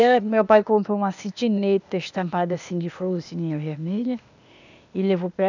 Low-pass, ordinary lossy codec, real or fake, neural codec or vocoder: 7.2 kHz; none; fake; codec, 16 kHz, 0.8 kbps, ZipCodec